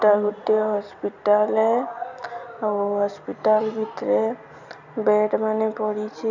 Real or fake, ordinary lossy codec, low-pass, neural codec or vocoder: real; none; 7.2 kHz; none